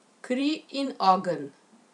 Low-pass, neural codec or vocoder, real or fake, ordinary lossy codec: 10.8 kHz; vocoder, 44.1 kHz, 128 mel bands every 512 samples, BigVGAN v2; fake; none